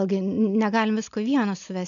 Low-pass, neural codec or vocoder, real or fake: 7.2 kHz; none; real